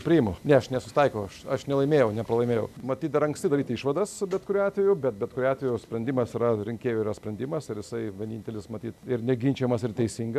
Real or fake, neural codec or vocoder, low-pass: real; none; 14.4 kHz